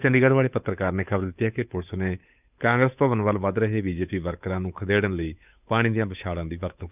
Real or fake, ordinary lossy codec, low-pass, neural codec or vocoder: fake; none; 3.6 kHz; codec, 16 kHz, 8 kbps, FunCodec, trained on Chinese and English, 25 frames a second